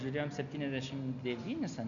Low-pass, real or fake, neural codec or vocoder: 7.2 kHz; real; none